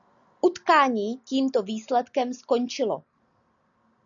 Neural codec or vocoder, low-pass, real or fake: none; 7.2 kHz; real